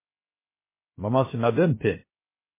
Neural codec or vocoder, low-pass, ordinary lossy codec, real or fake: codec, 16 kHz, 0.3 kbps, FocalCodec; 3.6 kHz; MP3, 16 kbps; fake